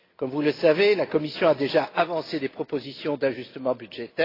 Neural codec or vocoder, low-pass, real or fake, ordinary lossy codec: none; 5.4 kHz; real; AAC, 24 kbps